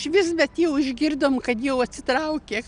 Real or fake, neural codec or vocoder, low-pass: real; none; 9.9 kHz